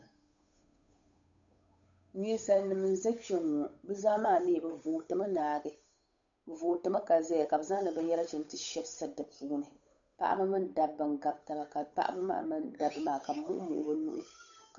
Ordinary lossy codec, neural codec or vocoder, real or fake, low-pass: MP3, 96 kbps; codec, 16 kHz, 8 kbps, FunCodec, trained on Chinese and English, 25 frames a second; fake; 7.2 kHz